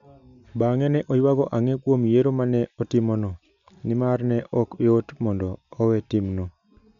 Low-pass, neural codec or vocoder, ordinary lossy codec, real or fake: 7.2 kHz; none; none; real